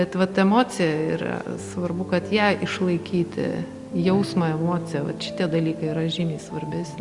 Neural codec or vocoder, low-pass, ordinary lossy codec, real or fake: none; 10.8 kHz; Opus, 64 kbps; real